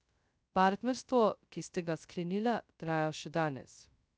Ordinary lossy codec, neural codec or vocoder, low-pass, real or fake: none; codec, 16 kHz, 0.2 kbps, FocalCodec; none; fake